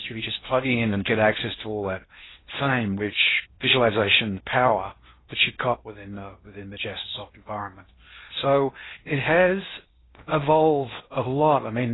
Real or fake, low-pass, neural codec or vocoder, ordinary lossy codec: fake; 7.2 kHz; codec, 16 kHz in and 24 kHz out, 0.6 kbps, FocalCodec, streaming, 4096 codes; AAC, 16 kbps